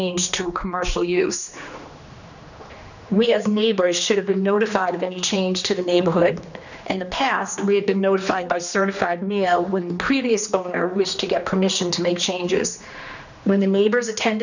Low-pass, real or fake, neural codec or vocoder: 7.2 kHz; fake; codec, 16 kHz, 2 kbps, X-Codec, HuBERT features, trained on general audio